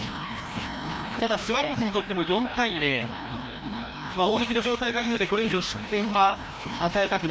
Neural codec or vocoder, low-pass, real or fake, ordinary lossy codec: codec, 16 kHz, 1 kbps, FreqCodec, larger model; none; fake; none